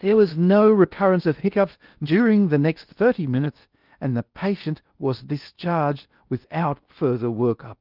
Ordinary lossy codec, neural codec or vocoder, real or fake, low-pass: Opus, 24 kbps; codec, 16 kHz in and 24 kHz out, 0.6 kbps, FocalCodec, streaming, 4096 codes; fake; 5.4 kHz